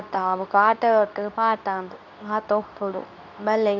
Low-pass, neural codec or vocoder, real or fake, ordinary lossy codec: 7.2 kHz; codec, 24 kHz, 0.9 kbps, WavTokenizer, medium speech release version 2; fake; none